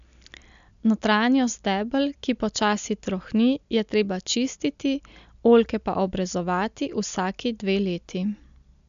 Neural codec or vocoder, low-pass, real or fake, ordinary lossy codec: none; 7.2 kHz; real; AAC, 96 kbps